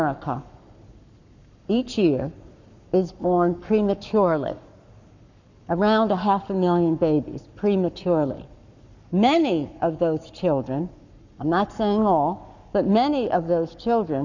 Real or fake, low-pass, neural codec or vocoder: fake; 7.2 kHz; codec, 44.1 kHz, 7.8 kbps, Pupu-Codec